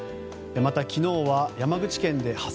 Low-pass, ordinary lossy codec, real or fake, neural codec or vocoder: none; none; real; none